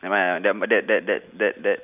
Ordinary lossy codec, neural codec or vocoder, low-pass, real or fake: none; none; 3.6 kHz; real